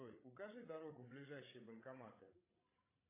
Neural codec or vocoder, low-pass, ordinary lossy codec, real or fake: codec, 16 kHz, 16 kbps, FreqCodec, larger model; 3.6 kHz; AAC, 16 kbps; fake